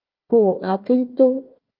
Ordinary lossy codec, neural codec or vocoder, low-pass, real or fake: Opus, 24 kbps; codec, 16 kHz, 1 kbps, FunCodec, trained on Chinese and English, 50 frames a second; 5.4 kHz; fake